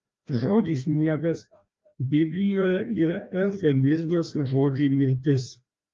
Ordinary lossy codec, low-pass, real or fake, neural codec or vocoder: Opus, 32 kbps; 7.2 kHz; fake; codec, 16 kHz, 1 kbps, FreqCodec, larger model